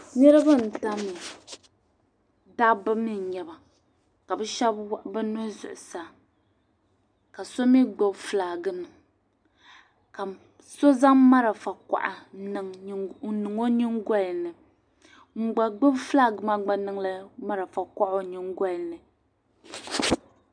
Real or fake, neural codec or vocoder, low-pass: real; none; 9.9 kHz